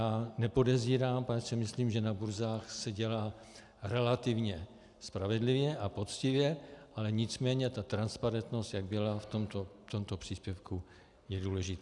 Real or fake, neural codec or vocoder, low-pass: real; none; 10.8 kHz